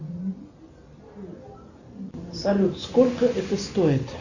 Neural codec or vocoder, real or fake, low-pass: none; real; 7.2 kHz